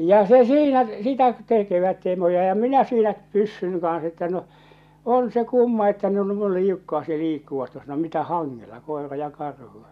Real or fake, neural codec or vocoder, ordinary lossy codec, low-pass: real; none; none; 14.4 kHz